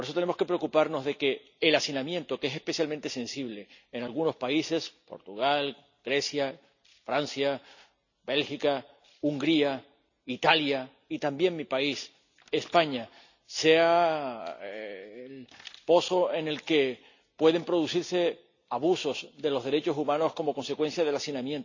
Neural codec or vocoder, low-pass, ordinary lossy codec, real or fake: none; 7.2 kHz; none; real